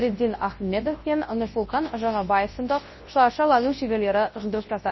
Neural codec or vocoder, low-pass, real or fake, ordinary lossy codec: codec, 24 kHz, 0.9 kbps, WavTokenizer, large speech release; 7.2 kHz; fake; MP3, 24 kbps